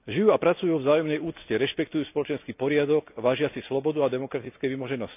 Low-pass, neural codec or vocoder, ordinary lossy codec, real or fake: 3.6 kHz; none; none; real